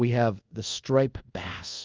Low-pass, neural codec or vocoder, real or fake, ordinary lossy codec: 7.2 kHz; codec, 24 kHz, 0.5 kbps, DualCodec; fake; Opus, 32 kbps